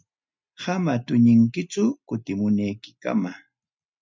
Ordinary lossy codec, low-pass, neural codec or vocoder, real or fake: MP3, 64 kbps; 7.2 kHz; none; real